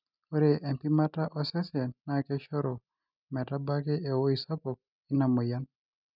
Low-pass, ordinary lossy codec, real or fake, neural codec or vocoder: 5.4 kHz; none; real; none